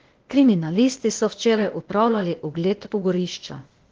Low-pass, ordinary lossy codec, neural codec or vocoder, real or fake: 7.2 kHz; Opus, 16 kbps; codec, 16 kHz, 0.8 kbps, ZipCodec; fake